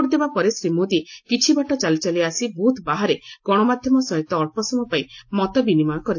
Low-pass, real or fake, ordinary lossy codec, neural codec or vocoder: 7.2 kHz; real; AAC, 48 kbps; none